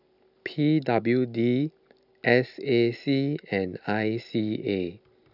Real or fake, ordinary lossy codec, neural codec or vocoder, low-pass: real; none; none; 5.4 kHz